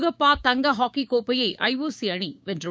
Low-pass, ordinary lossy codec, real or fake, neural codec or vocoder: none; none; fake; codec, 16 kHz, 6 kbps, DAC